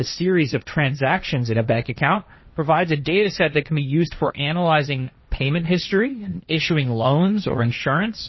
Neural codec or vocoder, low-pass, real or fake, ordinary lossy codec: codec, 16 kHz, 1.1 kbps, Voila-Tokenizer; 7.2 kHz; fake; MP3, 24 kbps